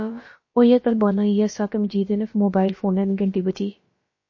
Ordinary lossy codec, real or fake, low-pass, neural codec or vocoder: MP3, 32 kbps; fake; 7.2 kHz; codec, 16 kHz, about 1 kbps, DyCAST, with the encoder's durations